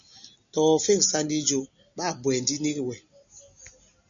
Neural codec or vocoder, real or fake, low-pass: none; real; 7.2 kHz